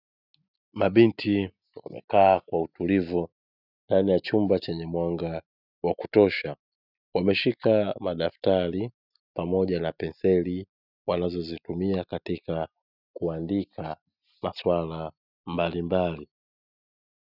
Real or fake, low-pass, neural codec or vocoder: real; 5.4 kHz; none